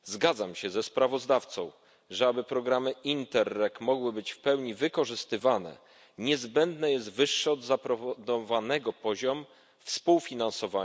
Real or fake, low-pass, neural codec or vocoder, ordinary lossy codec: real; none; none; none